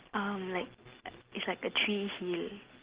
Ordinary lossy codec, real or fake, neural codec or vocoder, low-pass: Opus, 16 kbps; real; none; 3.6 kHz